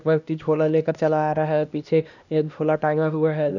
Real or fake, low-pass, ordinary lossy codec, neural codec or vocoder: fake; 7.2 kHz; none; codec, 16 kHz, 1 kbps, X-Codec, HuBERT features, trained on LibriSpeech